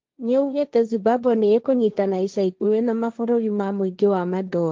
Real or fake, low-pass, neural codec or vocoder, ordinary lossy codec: fake; 7.2 kHz; codec, 16 kHz, 1.1 kbps, Voila-Tokenizer; Opus, 32 kbps